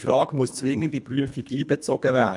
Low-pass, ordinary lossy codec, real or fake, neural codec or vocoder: 10.8 kHz; none; fake; codec, 24 kHz, 1.5 kbps, HILCodec